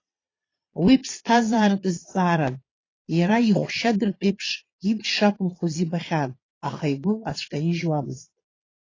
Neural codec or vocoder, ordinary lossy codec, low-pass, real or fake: vocoder, 22.05 kHz, 80 mel bands, Vocos; AAC, 32 kbps; 7.2 kHz; fake